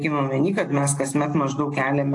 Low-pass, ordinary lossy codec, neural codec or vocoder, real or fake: 10.8 kHz; AAC, 48 kbps; none; real